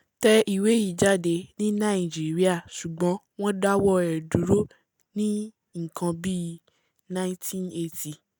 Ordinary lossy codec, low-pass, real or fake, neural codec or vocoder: none; none; real; none